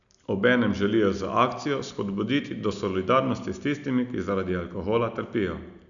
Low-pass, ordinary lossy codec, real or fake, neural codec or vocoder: 7.2 kHz; none; real; none